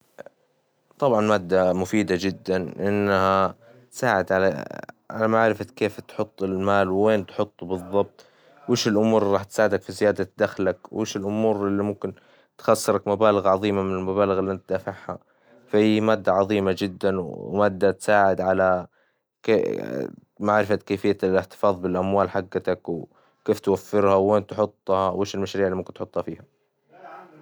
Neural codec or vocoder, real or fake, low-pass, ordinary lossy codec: none; real; none; none